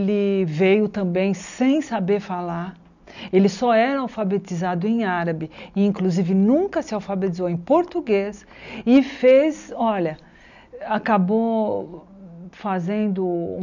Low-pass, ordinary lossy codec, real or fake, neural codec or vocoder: 7.2 kHz; none; real; none